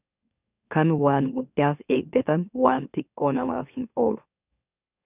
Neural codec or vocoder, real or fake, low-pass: autoencoder, 44.1 kHz, a latent of 192 numbers a frame, MeloTTS; fake; 3.6 kHz